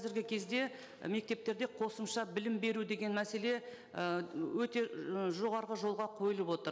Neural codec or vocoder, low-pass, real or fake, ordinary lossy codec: none; none; real; none